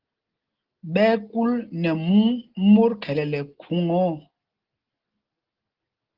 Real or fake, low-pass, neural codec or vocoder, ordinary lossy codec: real; 5.4 kHz; none; Opus, 16 kbps